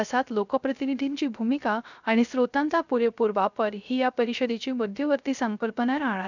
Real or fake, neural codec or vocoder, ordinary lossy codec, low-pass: fake; codec, 16 kHz, 0.3 kbps, FocalCodec; none; 7.2 kHz